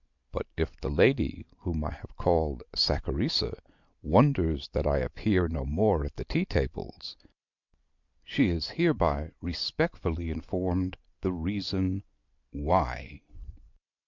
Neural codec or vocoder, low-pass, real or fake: none; 7.2 kHz; real